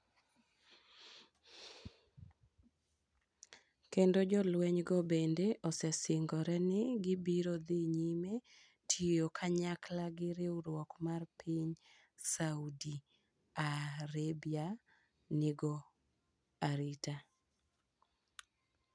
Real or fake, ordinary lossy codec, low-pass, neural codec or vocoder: real; none; 9.9 kHz; none